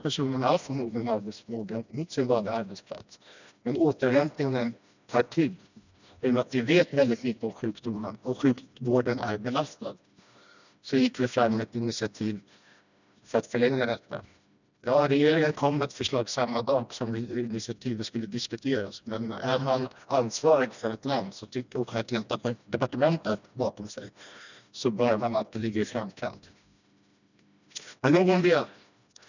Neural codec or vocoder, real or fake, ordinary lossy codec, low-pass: codec, 16 kHz, 1 kbps, FreqCodec, smaller model; fake; none; 7.2 kHz